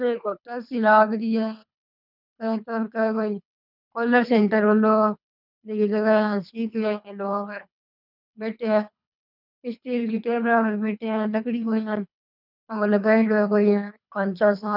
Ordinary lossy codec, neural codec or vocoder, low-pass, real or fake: none; codec, 24 kHz, 3 kbps, HILCodec; 5.4 kHz; fake